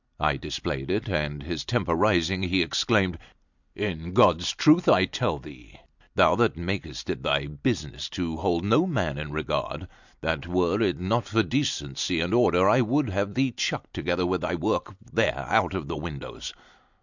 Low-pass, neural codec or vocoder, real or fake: 7.2 kHz; none; real